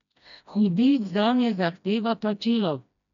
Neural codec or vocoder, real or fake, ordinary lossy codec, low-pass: codec, 16 kHz, 1 kbps, FreqCodec, smaller model; fake; none; 7.2 kHz